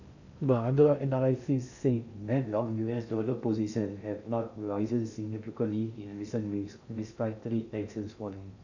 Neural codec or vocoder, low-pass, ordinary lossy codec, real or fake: codec, 16 kHz in and 24 kHz out, 0.6 kbps, FocalCodec, streaming, 2048 codes; 7.2 kHz; none; fake